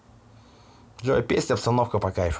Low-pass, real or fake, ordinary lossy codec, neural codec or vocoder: none; real; none; none